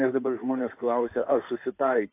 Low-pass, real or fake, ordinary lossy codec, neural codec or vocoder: 3.6 kHz; fake; AAC, 24 kbps; codec, 16 kHz in and 24 kHz out, 2.2 kbps, FireRedTTS-2 codec